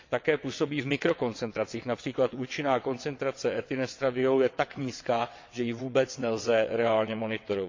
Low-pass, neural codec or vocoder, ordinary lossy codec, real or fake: 7.2 kHz; vocoder, 22.05 kHz, 80 mel bands, WaveNeXt; MP3, 48 kbps; fake